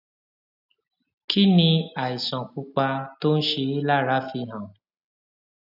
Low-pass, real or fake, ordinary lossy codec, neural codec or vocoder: 5.4 kHz; real; none; none